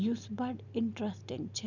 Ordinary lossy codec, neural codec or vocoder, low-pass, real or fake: none; none; 7.2 kHz; real